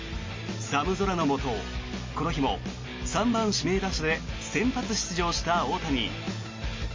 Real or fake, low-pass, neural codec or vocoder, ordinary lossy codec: real; 7.2 kHz; none; MP3, 32 kbps